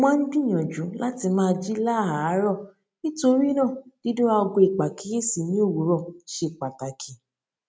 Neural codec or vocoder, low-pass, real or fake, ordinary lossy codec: none; none; real; none